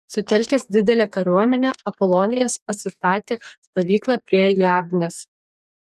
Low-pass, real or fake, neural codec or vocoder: 14.4 kHz; fake; codec, 44.1 kHz, 2.6 kbps, DAC